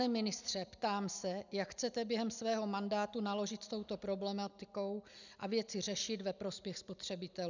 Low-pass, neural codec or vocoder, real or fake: 7.2 kHz; none; real